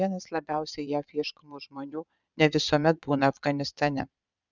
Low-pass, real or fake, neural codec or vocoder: 7.2 kHz; fake; vocoder, 22.05 kHz, 80 mel bands, Vocos